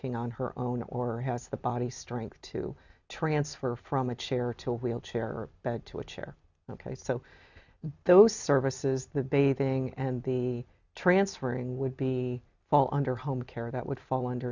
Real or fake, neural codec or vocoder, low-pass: real; none; 7.2 kHz